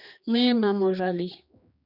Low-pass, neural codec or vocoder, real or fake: 5.4 kHz; codec, 16 kHz, 2 kbps, X-Codec, HuBERT features, trained on general audio; fake